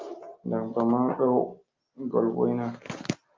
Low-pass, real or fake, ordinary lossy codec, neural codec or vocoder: 7.2 kHz; real; Opus, 24 kbps; none